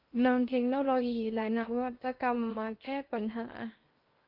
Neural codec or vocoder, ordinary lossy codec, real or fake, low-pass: codec, 16 kHz in and 24 kHz out, 0.6 kbps, FocalCodec, streaming, 2048 codes; Opus, 24 kbps; fake; 5.4 kHz